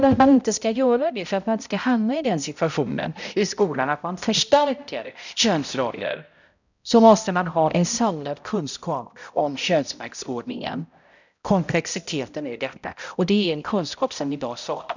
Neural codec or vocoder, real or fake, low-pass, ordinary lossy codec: codec, 16 kHz, 0.5 kbps, X-Codec, HuBERT features, trained on balanced general audio; fake; 7.2 kHz; none